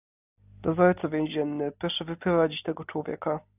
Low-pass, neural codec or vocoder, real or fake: 3.6 kHz; none; real